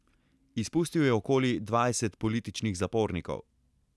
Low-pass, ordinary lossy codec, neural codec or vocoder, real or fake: none; none; none; real